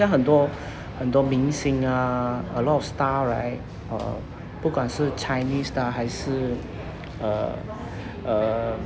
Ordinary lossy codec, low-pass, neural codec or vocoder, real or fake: none; none; none; real